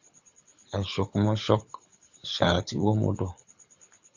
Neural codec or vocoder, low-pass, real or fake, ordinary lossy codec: codec, 24 kHz, 6 kbps, HILCodec; 7.2 kHz; fake; Opus, 64 kbps